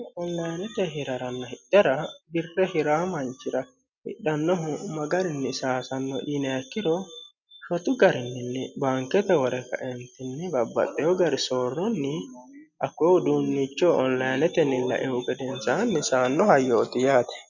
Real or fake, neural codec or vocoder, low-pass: real; none; 7.2 kHz